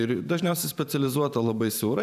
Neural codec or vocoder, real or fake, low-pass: vocoder, 44.1 kHz, 128 mel bands every 512 samples, BigVGAN v2; fake; 14.4 kHz